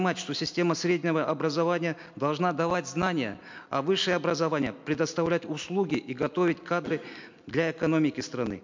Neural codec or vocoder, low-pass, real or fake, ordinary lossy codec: none; 7.2 kHz; real; MP3, 64 kbps